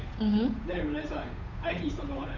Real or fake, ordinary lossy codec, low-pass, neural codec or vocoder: fake; none; 7.2 kHz; codec, 16 kHz, 8 kbps, FunCodec, trained on Chinese and English, 25 frames a second